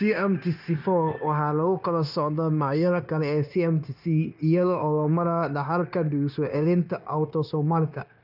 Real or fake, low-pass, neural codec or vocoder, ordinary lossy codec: fake; 5.4 kHz; codec, 16 kHz, 0.9 kbps, LongCat-Audio-Codec; none